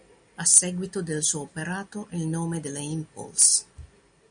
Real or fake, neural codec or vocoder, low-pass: real; none; 9.9 kHz